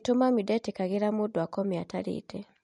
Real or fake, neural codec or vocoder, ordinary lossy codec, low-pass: real; none; MP3, 48 kbps; 14.4 kHz